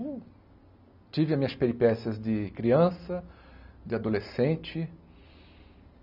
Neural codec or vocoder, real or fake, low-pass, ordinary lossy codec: none; real; 5.4 kHz; none